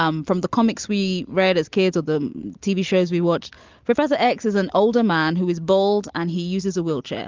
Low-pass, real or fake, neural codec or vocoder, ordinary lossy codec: 7.2 kHz; real; none; Opus, 32 kbps